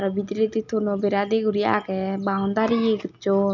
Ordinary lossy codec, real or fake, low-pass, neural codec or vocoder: none; real; 7.2 kHz; none